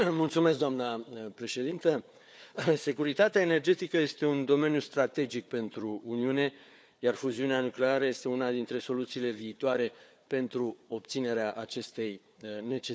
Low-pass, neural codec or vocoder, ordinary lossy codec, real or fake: none; codec, 16 kHz, 4 kbps, FunCodec, trained on Chinese and English, 50 frames a second; none; fake